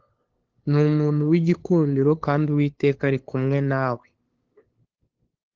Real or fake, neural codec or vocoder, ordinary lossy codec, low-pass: fake; codec, 16 kHz, 2 kbps, FunCodec, trained on LibriTTS, 25 frames a second; Opus, 16 kbps; 7.2 kHz